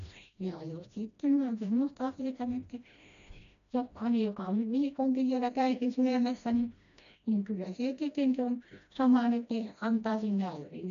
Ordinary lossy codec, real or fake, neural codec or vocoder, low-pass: none; fake; codec, 16 kHz, 1 kbps, FreqCodec, smaller model; 7.2 kHz